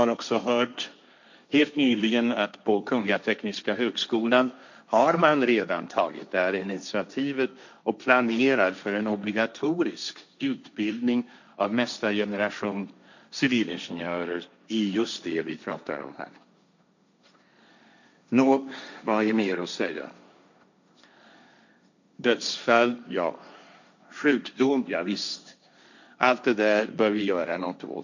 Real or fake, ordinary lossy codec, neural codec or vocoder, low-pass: fake; none; codec, 16 kHz, 1.1 kbps, Voila-Tokenizer; 7.2 kHz